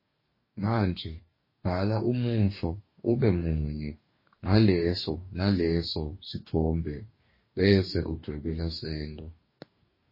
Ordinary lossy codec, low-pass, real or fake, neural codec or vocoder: MP3, 24 kbps; 5.4 kHz; fake; codec, 44.1 kHz, 2.6 kbps, DAC